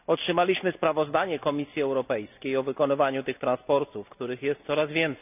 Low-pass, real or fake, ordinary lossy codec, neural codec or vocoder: 3.6 kHz; real; none; none